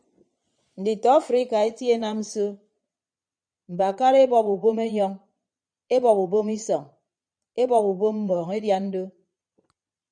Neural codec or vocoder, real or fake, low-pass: vocoder, 22.05 kHz, 80 mel bands, Vocos; fake; 9.9 kHz